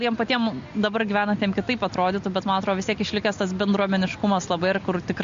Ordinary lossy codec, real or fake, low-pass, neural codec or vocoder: MP3, 48 kbps; real; 7.2 kHz; none